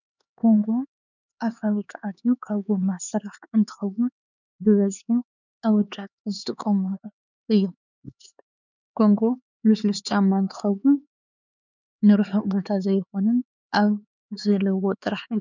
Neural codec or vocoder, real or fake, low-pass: codec, 16 kHz, 4 kbps, X-Codec, HuBERT features, trained on LibriSpeech; fake; 7.2 kHz